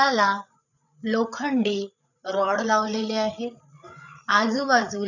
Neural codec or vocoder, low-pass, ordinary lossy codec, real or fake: codec, 16 kHz, 8 kbps, FreqCodec, larger model; 7.2 kHz; none; fake